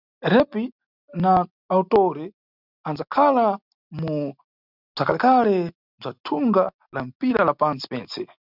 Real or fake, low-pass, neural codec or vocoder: real; 5.4 kHz; none